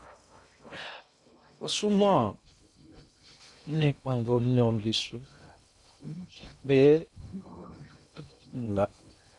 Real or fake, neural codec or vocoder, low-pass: fake; codec, 16 kHz in and 24 kHz out, 0.6 kbps, FocalCodec, streaming, 2048 codes; 10.8 kHz